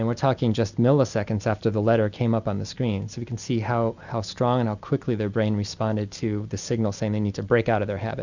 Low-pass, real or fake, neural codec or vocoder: 7.2 kHz; real; none